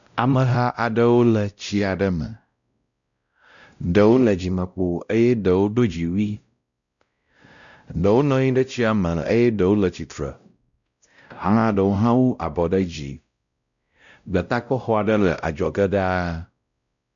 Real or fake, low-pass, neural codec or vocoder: fake; 7.2 kHz; codec, 16 kHz, 0.5 kbps, X-Codec, WavLM features, trained on Multilingual LibriSpeech